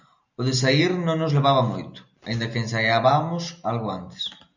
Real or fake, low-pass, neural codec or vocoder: real; 7.2 kHz; none